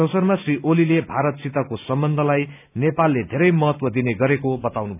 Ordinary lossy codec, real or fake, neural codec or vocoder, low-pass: none; real; none; 3.6 kHz